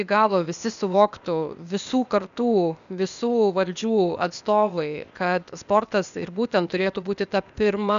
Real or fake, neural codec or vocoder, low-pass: fake; codec, 16 kHz, 0.8 kbps, ZipCodec; 7.2 kHz